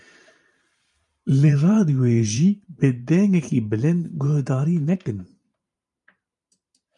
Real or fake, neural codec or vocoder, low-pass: fake; vocoder, 44.1 kHz, 128 mel bands every 512 samples, BigVGAN v2; 10.8 kHz